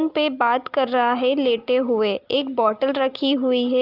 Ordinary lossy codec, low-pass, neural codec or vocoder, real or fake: Opus, 24 kbps; 5.4 kHz; none; real